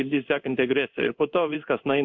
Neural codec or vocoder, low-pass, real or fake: codec, 16 kHz in and 24 kHz out, 1 kbps, XY-Tokenizer; 7.2 kHz; fake